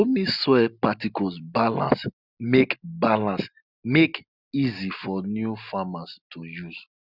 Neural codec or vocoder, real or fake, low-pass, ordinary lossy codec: none; real; 5.4 kHz; none